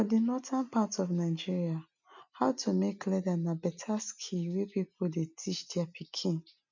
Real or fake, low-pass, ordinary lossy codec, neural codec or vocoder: real; 7.2 kHz; none; none